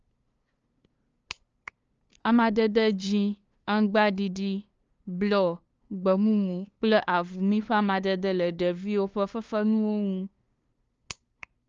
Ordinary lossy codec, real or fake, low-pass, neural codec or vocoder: Opus, 24 kbps; fake; 7.2 kHz; codec, 16 kHz, 2 kbps, FunCodec, trained on LibriTTS, 25 frames a second